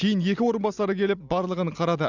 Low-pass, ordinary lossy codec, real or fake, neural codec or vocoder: 7.2 kHz; none; real; none